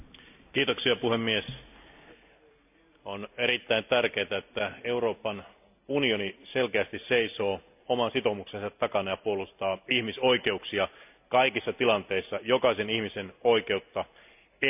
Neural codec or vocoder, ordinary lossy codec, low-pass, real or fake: none; none; 3.6 kHz; real